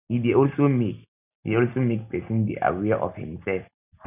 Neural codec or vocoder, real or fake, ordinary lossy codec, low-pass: none; real; none; 3.6 kHz